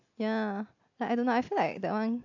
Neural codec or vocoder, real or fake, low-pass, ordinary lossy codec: none; real; 7.2 kHz; AAC, 48 kbps